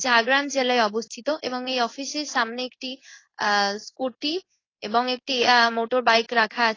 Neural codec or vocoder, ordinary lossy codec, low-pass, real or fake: codec, 16 kHz in and 24 kHz out, 1 kbps, XY-Tokenizer; AAC, 32 kbps; 7.2 kHz; fake